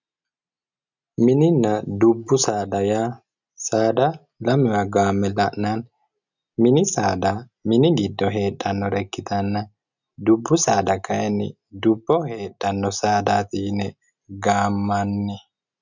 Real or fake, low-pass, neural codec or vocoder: real; 7.2 kHz; none